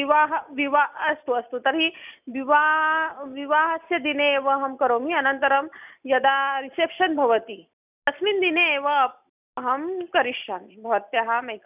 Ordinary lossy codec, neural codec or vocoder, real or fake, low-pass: none; none; real; 3.6 kHz